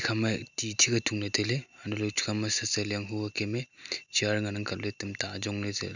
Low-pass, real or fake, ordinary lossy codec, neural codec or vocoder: 7.2 kHz; real; none; none